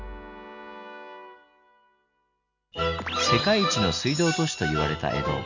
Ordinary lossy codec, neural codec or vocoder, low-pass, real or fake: none; none; 7.2 kHz; real